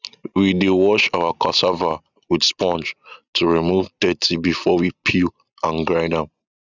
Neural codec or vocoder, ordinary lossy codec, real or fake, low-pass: none; none; real; 7.2 kHz